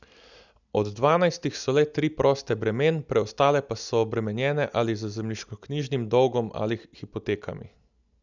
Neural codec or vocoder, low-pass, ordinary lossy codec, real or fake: none; 7.2 kHz; none; real